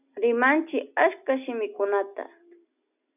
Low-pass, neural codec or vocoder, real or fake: 3.6 kHz; none; real